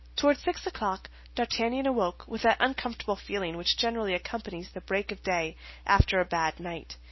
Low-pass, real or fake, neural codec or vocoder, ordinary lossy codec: 7.2 kHz; real; none; MP3, 24 kbps